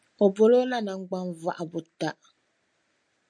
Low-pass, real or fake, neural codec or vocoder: 9.9 kHz; real; none